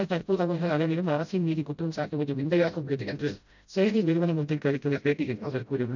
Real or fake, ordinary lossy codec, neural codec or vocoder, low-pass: fake; none; codec, 16 kHz, 0.5 kbps, FreqCodec, smaller model; 7.2 kHz